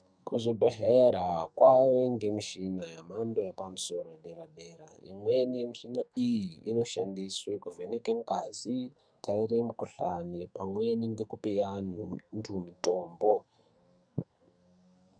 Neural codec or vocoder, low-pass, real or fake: codec, 44.1 kHz, 2.6 kbps, SNAC; 9.9 kHz; fake